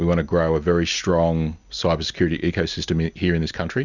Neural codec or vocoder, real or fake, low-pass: none; real; 7.2 kHz